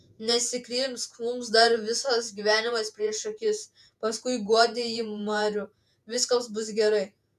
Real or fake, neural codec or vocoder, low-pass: fake; vocoder, 48 kHz, 128 mel bands, Vocos; 14.4 kHz